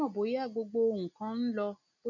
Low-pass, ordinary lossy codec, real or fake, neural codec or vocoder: 7.2 kHz; none; real; none